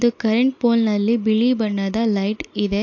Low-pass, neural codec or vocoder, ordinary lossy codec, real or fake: 7.2 kHz; none; AAC, 48 kbps; real